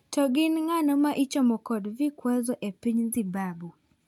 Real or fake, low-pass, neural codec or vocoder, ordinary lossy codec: real; 19.8 kHz; none; none